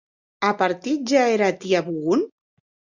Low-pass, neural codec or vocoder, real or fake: 7.2 kHz; none; real